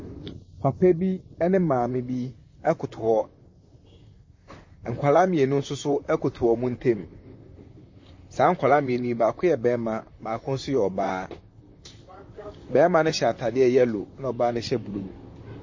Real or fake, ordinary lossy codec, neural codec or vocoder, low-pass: fake; MP3, 32 kbps; vocoder, 44.1 kHz, 128 mel bands, Pupu-Vocoder; 7.2 kHz